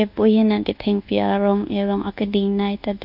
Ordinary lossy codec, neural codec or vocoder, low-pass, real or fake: none; codec, 24 kHz, 1.2 kbps, DualCodec; 5.4 kHz; fake